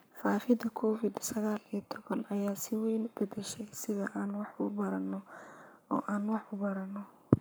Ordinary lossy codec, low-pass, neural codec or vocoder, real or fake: none; none; codec, 44.1 kHz, 7.8 kbps, Pupu-Codec; fake